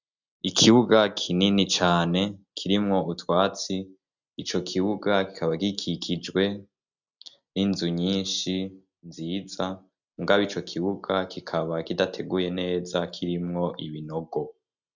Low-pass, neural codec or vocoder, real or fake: 7.2 kHz; none; real